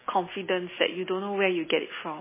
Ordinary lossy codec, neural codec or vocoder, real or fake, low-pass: MP3, 16 kbps; none; real; 3.6 kHz